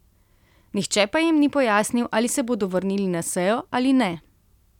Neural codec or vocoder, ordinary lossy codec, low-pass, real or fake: none; none; 19.8 kHz; real